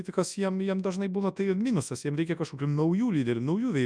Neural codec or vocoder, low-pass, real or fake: codec, 24 kHz, 0.9 kbps, WavTokenizer, large speech release; 9.9 kHz; fake